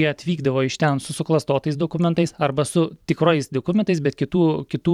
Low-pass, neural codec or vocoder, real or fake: 19.8 kHz; vocoder, 44.1 kHz, 128 mel bands every 512 samples, BigVGAN v2; fake